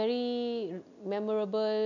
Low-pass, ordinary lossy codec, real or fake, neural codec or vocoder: 7.2 kHz; none; real; none